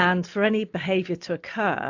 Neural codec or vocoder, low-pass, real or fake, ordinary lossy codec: none; 7.2 kHz; real; AAC, 48 kbps